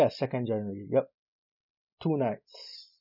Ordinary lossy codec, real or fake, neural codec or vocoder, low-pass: none; real; none; 5.4 kHz